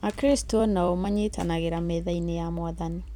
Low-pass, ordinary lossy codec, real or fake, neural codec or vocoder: 19.8 kHz; none; fake; vocoder, 44.1 kHz, 128 mel bands every 256 samples, BigVGAN v2